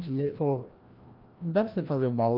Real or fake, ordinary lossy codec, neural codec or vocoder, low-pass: fake; Opus, 24 kbps; codec, 16 kHz, 1 kbps, FreqCodec, larger model; 5.4 kHz